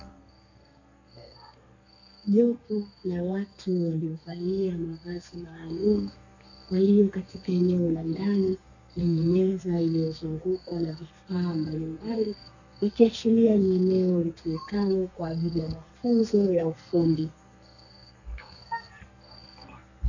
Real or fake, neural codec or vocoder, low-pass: fake; codec, 32 kHz, 1.9 kbps, SNAC; 7.2 kHz